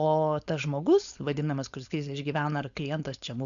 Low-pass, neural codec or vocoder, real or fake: 7.2 kHz; codec, 16 kHz, 4.8 kbps, FACodec; fake